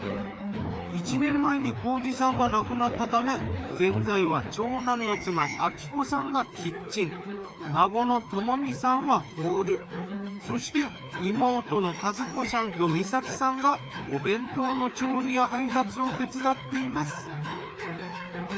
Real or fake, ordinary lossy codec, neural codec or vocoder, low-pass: fake; none; codec, 16 kHz, 2 kbps, FreqCodec, larger model; none